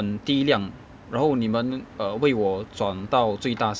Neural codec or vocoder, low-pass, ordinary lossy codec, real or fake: none; none; none; real